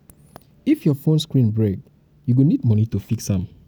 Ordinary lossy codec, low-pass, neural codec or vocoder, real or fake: none; none; none; real